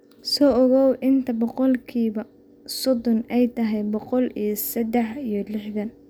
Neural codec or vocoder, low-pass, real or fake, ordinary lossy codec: none; none; real; none